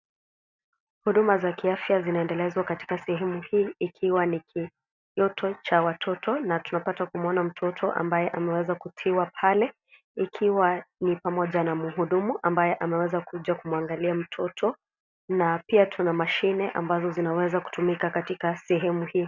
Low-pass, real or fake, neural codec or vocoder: 7.2 kHz; real; none